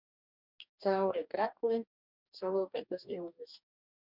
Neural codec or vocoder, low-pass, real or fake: codec, 44.1 kHz, 2.6 kbps, DAC; 5.4 kHz; fake